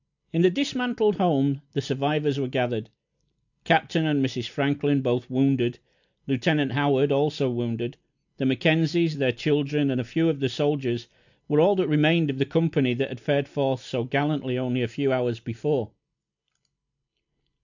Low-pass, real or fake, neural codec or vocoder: 7.2 kHz; real; none